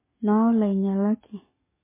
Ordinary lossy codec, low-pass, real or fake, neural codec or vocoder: MP3, 16 kbps; 3.6 kHz; fake; codec, 44.1 kHz, 7.8 kbps, DAC